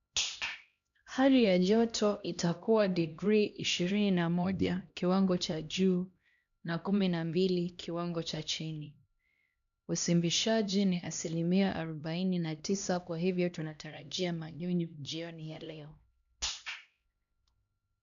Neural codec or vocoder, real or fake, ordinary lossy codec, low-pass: codec, 16 kHz, 1 kbps, X-Codec, HuBERT features, trained on LibriSpeech; fake; none; 7.2 kHz